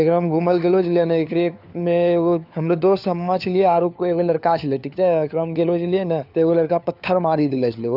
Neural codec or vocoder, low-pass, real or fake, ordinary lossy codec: codec, 16 kHz, 4 kbps, FunCodec, trained on LibriTTS, 50 frames a second; 5.4 kHz; fake; none